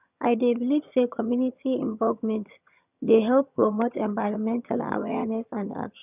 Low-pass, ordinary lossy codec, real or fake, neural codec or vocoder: 3.6 kHz; none; fake; vocoder, 22.05 kHz, 80 mel bands, HiFi-GAN